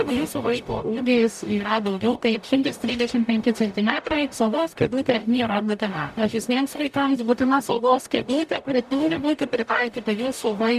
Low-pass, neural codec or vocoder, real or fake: 14.4 kHz; codec, 44.1 kHz, 0.9 kbps, DAC; fake